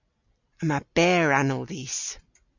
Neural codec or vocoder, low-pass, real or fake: none; 7.2 kHz; real